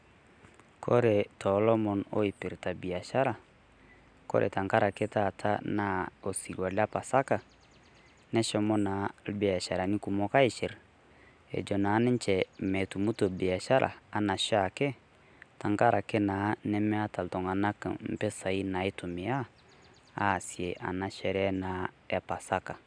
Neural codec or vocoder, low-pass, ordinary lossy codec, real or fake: none; 9.9 kHz; none; real